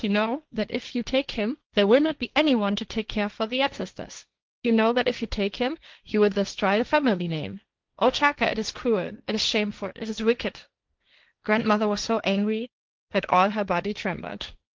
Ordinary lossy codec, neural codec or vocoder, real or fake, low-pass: Opus, 32 kbps; codec, 16 kHz, 1.1 kbps, Voila-Tokenizer; fake; 7.2 kHz